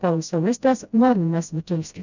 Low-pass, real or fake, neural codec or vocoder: 7.2 kHz; fake; codec, 16 kHz, 0.5 kbps, FreqCodec, smaller model